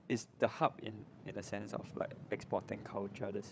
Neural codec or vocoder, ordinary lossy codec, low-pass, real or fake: codec, 16 kHz, 8 kbps, FreqCodec, larger model; none; none; fake